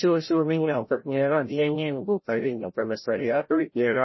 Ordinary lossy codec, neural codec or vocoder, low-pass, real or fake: MP3, 24 kbps; codec, 16 kHz, 0.5 kbps, FreqCodec, larger model; 7.2 kHz; fake